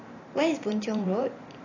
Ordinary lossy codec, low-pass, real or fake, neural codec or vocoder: AAC, 32 kbps; 7.2 kHz; fake; vocoder, 44.1 kHz, 128 mel bands every 512 samples, BigVGAN v2